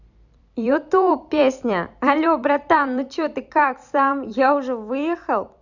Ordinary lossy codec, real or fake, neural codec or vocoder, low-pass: none; fake; vocoder, 44.1 kHz, 128 mel bands every 256 samples, BigVGAN v2; 7.2 kHz